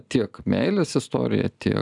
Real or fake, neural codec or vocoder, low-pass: real; none; 10.8 kHz